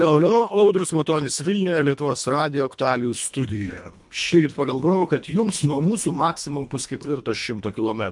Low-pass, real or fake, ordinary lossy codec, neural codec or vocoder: 10.8 kHz; fake; MP3, 64 kbps; codec, 24 kHz, 1.5 kbps, HILCodec